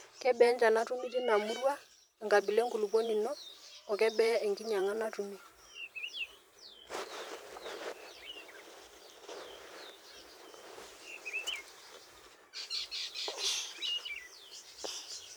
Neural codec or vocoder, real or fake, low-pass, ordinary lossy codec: vocoder, 44.1 kHz, 128 mel bands every 512 samples, BigVGAN v2; fake; none; none